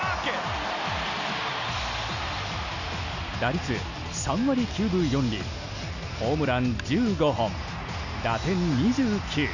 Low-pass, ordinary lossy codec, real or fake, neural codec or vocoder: 7.2 kHz; none; real; none